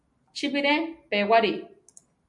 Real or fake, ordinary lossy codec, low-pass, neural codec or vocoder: real; MP3, 64 kbps; 10.8 kHz; none